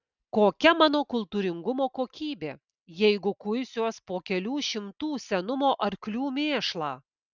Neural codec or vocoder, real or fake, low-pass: none; real; 7.2 kHz